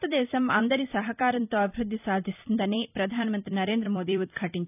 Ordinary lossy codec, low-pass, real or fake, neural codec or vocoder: none; 3.6 kHz; fake; vocoder, 44.1 kHz, 128 mel bands every 256 samples, BigVGAN v2